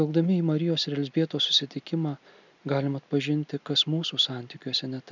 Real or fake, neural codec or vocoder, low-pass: real; none; 7.2 kHz